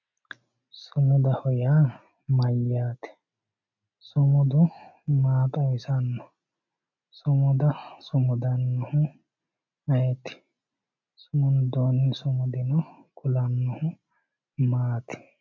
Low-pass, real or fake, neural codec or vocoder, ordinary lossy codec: 7.2 kHz; real; none; MP3, 64 kbps